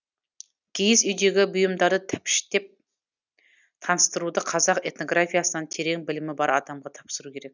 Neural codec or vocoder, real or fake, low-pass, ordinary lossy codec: none; real; 7.2 kHz; none